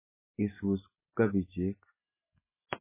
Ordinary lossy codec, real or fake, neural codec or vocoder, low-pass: MP3, 16 kbps; real; none; 3.6 kHz